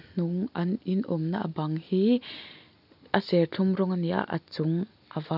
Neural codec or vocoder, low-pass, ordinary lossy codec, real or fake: none; 5.4 kHz; none; real